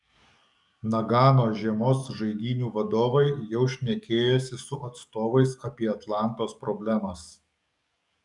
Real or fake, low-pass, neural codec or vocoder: fake; 10.8 kHz; codec, 44.1 kHz, 7.8 kbps, DAC